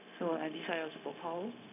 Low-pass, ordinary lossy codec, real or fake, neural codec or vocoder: 3.6 kHz; none; fake; codec, 16 kHz, 0.4 kbps, LongCat-Audio-Codec